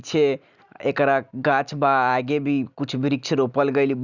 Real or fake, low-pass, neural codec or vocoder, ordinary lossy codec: real; 7.2 kHz; none; none